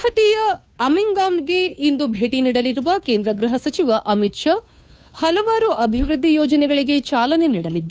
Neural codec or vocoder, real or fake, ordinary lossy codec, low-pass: codec, 16 kHz, 2 kbps, FunCodec, trained on Chinese and English, 25 frames a second; fake; none; none